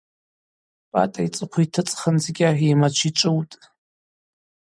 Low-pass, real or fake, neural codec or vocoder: 9.9 kHz; real; none